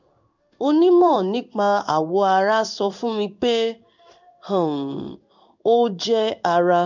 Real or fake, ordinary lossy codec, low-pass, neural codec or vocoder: fake; none; 7.2 kHz; codec, 16 kHz in and 24 kHz out, 1 kbps, XY-Tokenizer